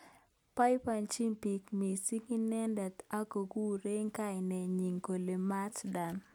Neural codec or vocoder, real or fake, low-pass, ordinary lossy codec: none; real; none; none